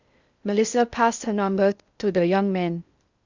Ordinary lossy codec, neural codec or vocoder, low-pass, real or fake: Opus, 64 kbps; codec, 16 kHz in and 24 kHz out, 0.6 kbps, FocalCodec, streaming, 4096 codes; 7.2 kHz; fake